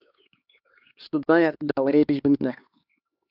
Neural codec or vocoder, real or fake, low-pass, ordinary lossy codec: codec, 16 kHz, 2 kbps, X-Codec, HuBERT features, trained on LibriSpeech; fake; 5.4 kHz; AAC, 48 kbps